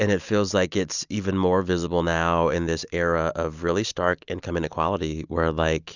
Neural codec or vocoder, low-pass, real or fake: none; 7.2 kHz; real